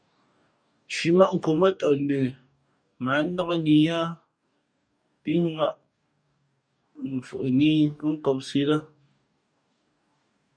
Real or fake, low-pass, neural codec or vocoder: fake; 9.9 kHz; codec, 44.1 kHz, 2.6 kbps, DAC